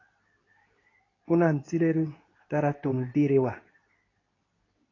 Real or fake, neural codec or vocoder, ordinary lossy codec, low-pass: fake; codec, 24 kHz, 0.9 kbps, WavTokenizer, medium speech release version 2; AAC, 32 kbps; 7.2 kHz